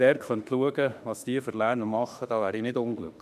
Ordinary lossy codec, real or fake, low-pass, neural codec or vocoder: none; fake; 14.4 kHz; autoencoder, 48 kHz, 32 numbers a frame, DAC-VAE, trained on Japanese speech